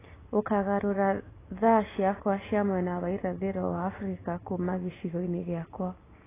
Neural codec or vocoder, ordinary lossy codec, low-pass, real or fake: none; AAC, 16 kbps; 3.6 kHz; real